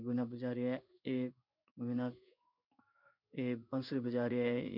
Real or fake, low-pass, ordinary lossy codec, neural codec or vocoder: fake; 5.4 kHz; none; codec, 16 kHz in and 24 kHz out, 1 kbps, XY-Tokenizer